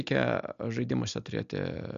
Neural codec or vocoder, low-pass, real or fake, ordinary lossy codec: none; 7.2 kHz; real; MP3, 64 kbps